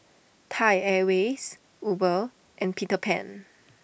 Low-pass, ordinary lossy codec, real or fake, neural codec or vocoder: none; none; real; none